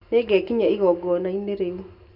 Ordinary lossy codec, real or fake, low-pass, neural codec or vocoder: none; real; 5.4 kHz; none